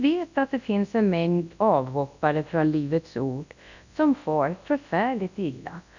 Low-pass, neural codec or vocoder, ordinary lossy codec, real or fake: 7.2 kHz; codec, 24 kHz, 0.9 kbps, WavTokenizer, large speech release; none; fake